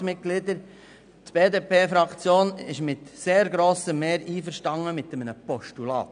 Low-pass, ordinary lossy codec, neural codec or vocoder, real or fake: 9.9 kHz; none; none; real